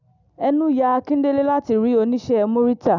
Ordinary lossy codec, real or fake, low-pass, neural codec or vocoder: none; real; 7.2 kHz; none